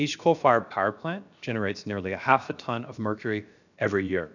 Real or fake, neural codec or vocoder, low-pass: fake; codec, 16 kHz, about 1 kbps, DyCAST, with the encoder's durations; 7.2 kHz